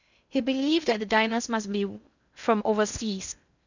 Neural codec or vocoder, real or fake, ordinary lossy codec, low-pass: codec, 16 kHz in and 24 kHz out, 0.6 kbps, FocalCodec, streaming, 2048 codes; fake; none; 7.2 kHz